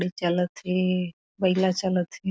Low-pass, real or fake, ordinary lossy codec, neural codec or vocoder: none; real; none; none